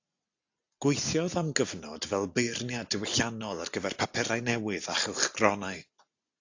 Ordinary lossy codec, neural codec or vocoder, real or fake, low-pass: AAC, 48 kbps; none; real; 7.2 kHz